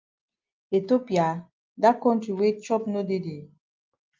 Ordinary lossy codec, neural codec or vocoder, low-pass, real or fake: Opus, 24 kbps; none; 7.2 kHz; real